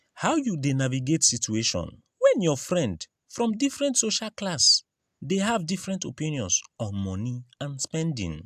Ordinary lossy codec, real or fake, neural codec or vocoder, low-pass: AAC, 96 kbps; real; none; 14.4 kHz